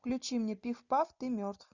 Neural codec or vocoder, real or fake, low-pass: none; real; 7.2 kHz